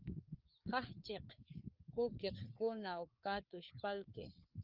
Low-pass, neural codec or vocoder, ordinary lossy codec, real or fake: 5.4 kHz; codec, 16 kHz, 4 kbps, FreqCodec, larger model; Opus, 24 kbps; fake